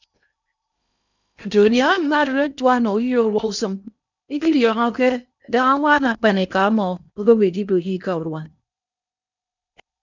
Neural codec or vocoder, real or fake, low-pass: codec, 16 kHz in and 24 kHz out, 0.8 kbps, FocalCodec, streaming, 65536 codes; fake; 7.2 kHz